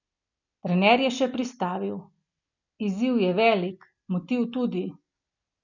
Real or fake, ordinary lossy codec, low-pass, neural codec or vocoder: real; none; 7.2 kHz; none